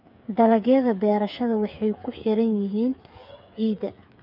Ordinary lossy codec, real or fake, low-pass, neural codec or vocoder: none; fake; 5.4 kHz; codec, 16 kHz, 8 kbps, FreqCodec, smaller model